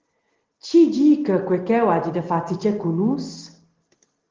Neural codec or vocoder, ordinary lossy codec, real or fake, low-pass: none; Opus, 16 kbps; real; 7.2 kHz